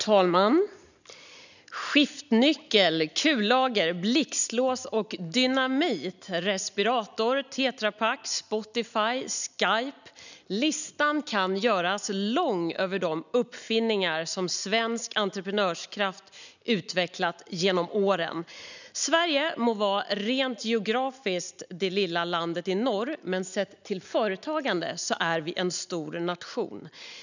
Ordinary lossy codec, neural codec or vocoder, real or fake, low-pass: none; none; real; 7.2 kHz